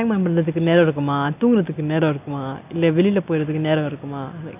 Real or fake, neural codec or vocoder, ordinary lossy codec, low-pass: real; none; none; 3.6 kHz